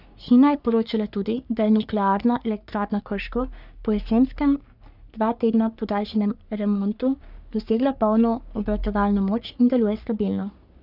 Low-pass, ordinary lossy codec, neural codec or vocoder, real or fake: 5.4 kHz; none; codec, 44.1 kHz, 3.4 kbps, Pupu-Codec; fake